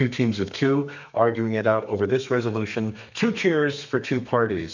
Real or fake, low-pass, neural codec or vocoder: fake; 7.2 kHz; codec, 32 kHz, 1.9 kbps, SNAC